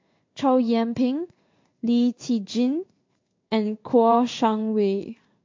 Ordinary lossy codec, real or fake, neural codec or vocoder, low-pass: MP3, 48 kbps; fake; codec, 16 kHz in and 24 kHz out, 1 kbps, XY-Tokenizer; 7.2 kHz